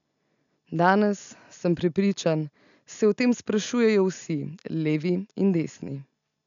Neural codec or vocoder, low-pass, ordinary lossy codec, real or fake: none; 7.2 kHz; none; real